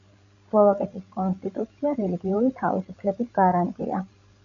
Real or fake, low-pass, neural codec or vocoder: fake; 7.2 kHz; codec, 16 kHz, 16 kbps, FreqCodec, larger model